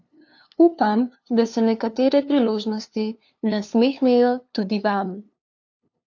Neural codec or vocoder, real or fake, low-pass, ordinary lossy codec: codec, 16 kHz, 2 kbps, FunCodec, trained on LibriTTS, 25 frames a second; fake; 7.2 kHz; AAC, 48 kbps